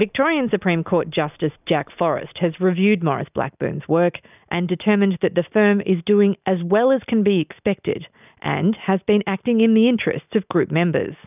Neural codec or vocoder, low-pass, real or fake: none; 3.6 kHz; real